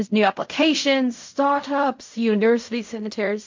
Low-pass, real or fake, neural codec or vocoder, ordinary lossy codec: 7.2 kHz; fake; codec, 16 kHz in and 24 kHz out, 0.4 kbps, LongCat-Audio-Codec, fine tuned four codebook decoder; MP3, 48 kbps